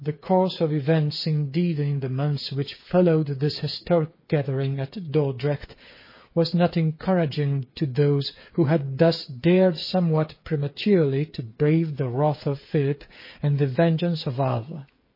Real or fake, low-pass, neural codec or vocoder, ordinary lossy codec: fake; 5.4 kHz; codec, 16 kHz, 8 kbps, FreqCodec, smaller model; MP3, 24 kbps